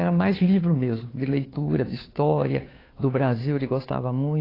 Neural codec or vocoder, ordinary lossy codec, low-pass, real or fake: codec, 16 kHz, 2 kbps, FunCodec, trained on Chinese and English, 25 frames a second; AAC, 24 kbps; 5.4 kHz; fake